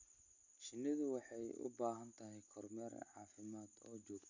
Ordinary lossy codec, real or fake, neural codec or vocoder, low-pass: none; real; none; 7.2 kHz